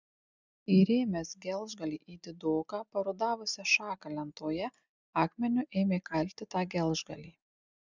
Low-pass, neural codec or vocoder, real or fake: 7.2 kHz; none; real